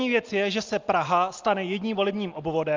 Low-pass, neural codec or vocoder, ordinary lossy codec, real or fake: 7.2 kHz; none; Opus, 32 kbps; real